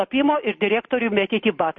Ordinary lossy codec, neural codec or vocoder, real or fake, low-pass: MP3, 32 kbps; none; real; 9.9 kHz